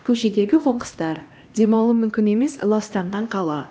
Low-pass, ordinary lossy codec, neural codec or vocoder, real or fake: none; none; codec, 16 kHz, 1 kbps, X-Codec, HuBERT features, trained on LibriSpeech; fake